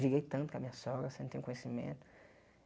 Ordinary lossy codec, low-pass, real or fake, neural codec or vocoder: none; none; real; none